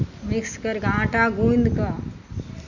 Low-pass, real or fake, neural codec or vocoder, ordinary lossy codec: 7.2 kHz; real; none; none